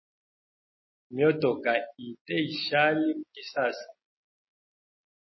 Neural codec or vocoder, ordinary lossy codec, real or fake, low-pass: none; MP3, 24 kbps; real; 7.2 kHz